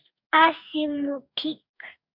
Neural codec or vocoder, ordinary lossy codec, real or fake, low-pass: codec, 44.1 kHz, 2.6 kbps, DAC; MP3, 48 kbps; fake; 5.4 kHz